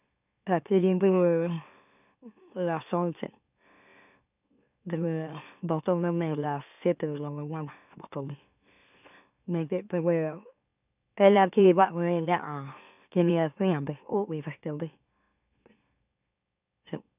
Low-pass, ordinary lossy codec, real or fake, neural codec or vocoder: 3.6 kHz; none; fake; autoencoder, 44.1 kHz, a latent of 192 numbers a frame, MeloTTS